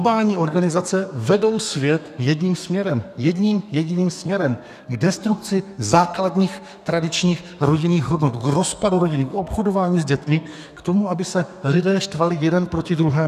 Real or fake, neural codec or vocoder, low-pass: fake; codec, 32 kHz, 1.9 kbps, SNAC; 14.4 kHz